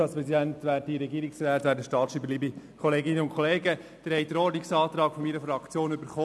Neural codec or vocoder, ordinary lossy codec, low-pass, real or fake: none; none; none; real